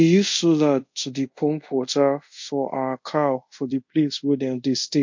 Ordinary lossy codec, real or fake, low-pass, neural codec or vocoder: MP3, 48 kbps; fake; 7.2 kHz; codec, 24 kHz, 0.5 kbps, DualCodec